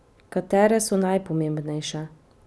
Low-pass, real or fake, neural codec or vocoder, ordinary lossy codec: none; real; none; none